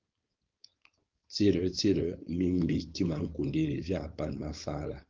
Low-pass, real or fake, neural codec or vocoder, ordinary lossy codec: 7.2 kHz; fake; codec, 16 kHz, 4.8 kbps, FACodec; Opus, 32 kbps